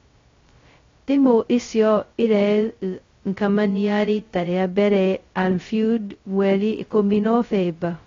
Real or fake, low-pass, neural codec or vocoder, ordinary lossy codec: fake; 7.2 kHz; codec, 16 kHz, 0.2 kbps, FocalCodec; AAC, 32 kbps